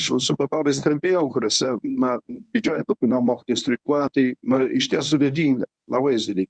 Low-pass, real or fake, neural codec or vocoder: 9.9 kHz; fake; codec, 24 kHz, 0.9 kbps, WavTokenizer, medium speech release version 1